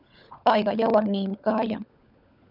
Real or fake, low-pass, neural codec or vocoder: fake; 5.4 kHz; codec, 16 kHz, 16 kbps, FunCodec, trained on LibriTTS, 50 frames a second